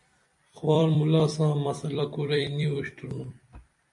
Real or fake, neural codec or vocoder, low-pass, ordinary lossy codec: fake; vocoder, 44.1 kHz, 128 mel bands every 256 samples, BigVGAN v2; 10.8 kHz; MP3, 48 kbps